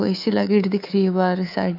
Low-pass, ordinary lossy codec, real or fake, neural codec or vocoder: 5.4 kHz; none; fake; codec, 24 kHz, 3.1 kbps, DualCodec